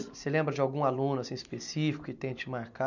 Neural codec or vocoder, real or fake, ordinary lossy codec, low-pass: none; real; none; 7.2 kHz